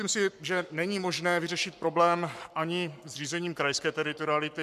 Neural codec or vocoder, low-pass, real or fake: codec, 44.1 kHz, 7.8 kbps, Pupu-Codec; 14.4 kHz; fake